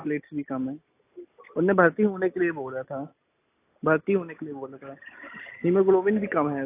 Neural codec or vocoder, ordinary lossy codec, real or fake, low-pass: none; AAC, 24 kbps; real; 3.6 kHz